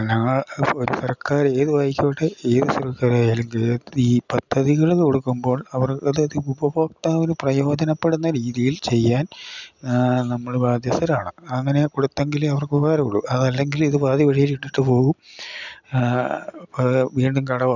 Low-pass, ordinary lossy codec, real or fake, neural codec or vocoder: 7.2 kHz; none; real; none